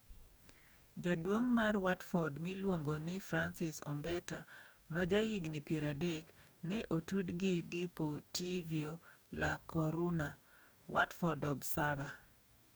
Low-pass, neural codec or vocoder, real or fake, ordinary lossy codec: none; codec, 44.1 kHz, 2.6 kbps, DAC; fake; none